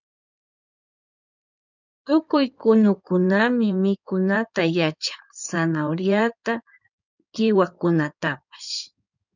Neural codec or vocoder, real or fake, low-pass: codec, 16 kHz in and 24 kHz out, 2.2 kbps, FireRedTTS-2 codec; fake; 7.2 kHz